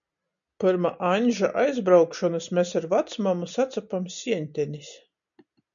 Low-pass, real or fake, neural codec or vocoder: 7.2 kHz; real; none